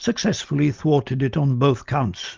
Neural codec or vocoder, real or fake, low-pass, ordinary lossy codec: none; real; 7.2 kHz; Opus, 32 kbps